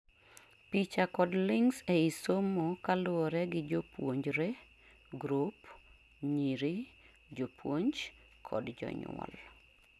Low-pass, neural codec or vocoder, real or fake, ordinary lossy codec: none; none; real; none